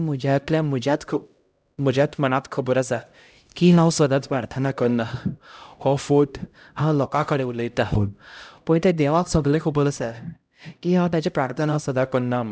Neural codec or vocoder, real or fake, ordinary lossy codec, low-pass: codec, 16 kHz, 0.5 kbps, X-Codec, HuBERT features, trained on LibriSpeech; fake; none; none